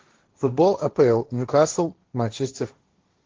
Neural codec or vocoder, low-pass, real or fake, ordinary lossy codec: codec, 16 kHz, 1.1 kbps, Voila-Tokenizer; 7.2 kHz; fake; Opus, 16 kbps